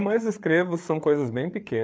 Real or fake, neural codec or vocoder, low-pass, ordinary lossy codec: fake; codec, 16 kHz, 4 kbps, FreqCodec, larger model; none; none